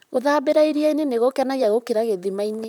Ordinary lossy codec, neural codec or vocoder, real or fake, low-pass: none; vocoder, 44.1 kHz, 128 mel bands, Pupu-Vocoder; fake; 19.8 kHz